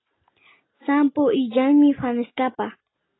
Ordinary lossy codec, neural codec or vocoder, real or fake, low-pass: AAC, 16 kbps; none; real; 7.2 kHz